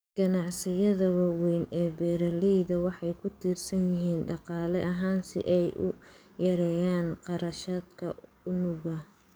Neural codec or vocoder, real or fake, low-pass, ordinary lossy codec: codec, 44.1 kHz, 7.8 kbps, DAC; fake; none; none